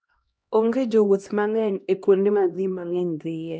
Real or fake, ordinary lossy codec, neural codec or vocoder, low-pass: fake; none; codec, 16 kHz, 1 kbps, X-Codec, HuBERT features, trained on LibriSpeech; none